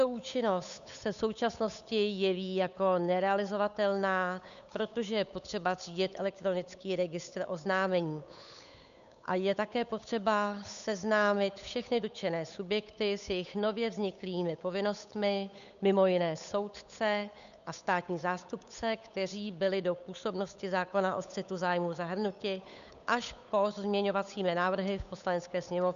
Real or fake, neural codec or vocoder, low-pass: fake; codec, 16 kHz, 8 kbps, FunCodec, trained on Chinese and English, 25 frames a second; 7.2 kHz